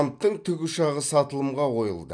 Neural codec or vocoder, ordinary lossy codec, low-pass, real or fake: none; none; 9.9 kHz; real